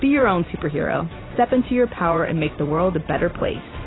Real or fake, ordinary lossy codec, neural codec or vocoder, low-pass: fake; AAC, 16 kbps; vocoder, 44.1 kHz, 128 mel bands every 512 samples, BigVGAN v2; 7.2 kHz